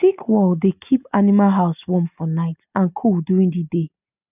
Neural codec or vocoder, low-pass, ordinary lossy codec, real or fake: none; 3.6 kHz; none; real